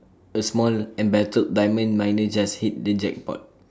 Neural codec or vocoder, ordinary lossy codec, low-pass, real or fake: none; none; none; real